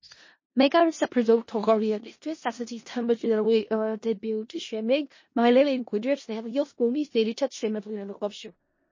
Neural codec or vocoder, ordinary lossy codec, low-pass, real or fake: codec, 16 kHz in and 24 kHz out, 0.4 kbps, LongCat-Audio-Codec, four codebook decoder; MP3, 32 kbps; 7.2 kHz; fake